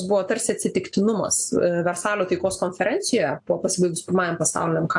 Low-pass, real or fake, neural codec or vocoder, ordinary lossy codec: 10.8 kHz; real; none; AAC, 64 kbps